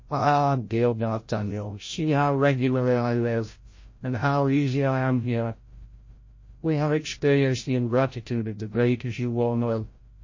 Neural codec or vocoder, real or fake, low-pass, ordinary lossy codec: codec, 16 kHz, 0.5 kbps, FreqCodec, larger model; fake; 7.2 kHz; MP3, 32 kbps